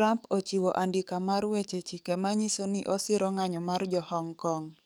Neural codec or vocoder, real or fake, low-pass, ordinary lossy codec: codec, 44.1 kHz, 7.8 kbps, DAC; fake; none; none